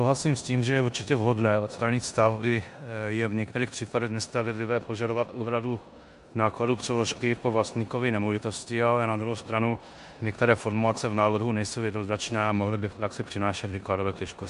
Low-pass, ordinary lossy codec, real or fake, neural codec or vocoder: 10.8 kHz; MP3, 64 kbps; fake; codec, 16 kHz in and 24 kHz out, 0.9 kbps, LongCat-Audio-Codec, four codebook decoder